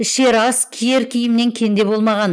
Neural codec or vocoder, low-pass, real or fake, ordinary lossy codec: none; none; real; none